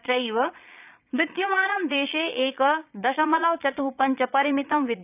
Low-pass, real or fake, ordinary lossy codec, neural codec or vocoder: 3.6 kHz; fake; none; vocoder, 22.05 kHz, 80 mel bands, Vocos